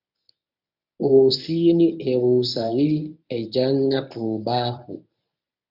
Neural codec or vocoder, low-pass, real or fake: codec, 24 kHz, 0.9 kbps, WavTokenizer, medium speech release version 1; 5.4 kHz; fake